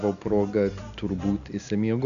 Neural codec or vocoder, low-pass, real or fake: none; 7.2 kHz; real